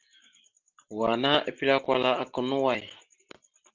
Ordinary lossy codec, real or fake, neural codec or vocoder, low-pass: Opus, 24 kbps; real; none; 7.2 kHz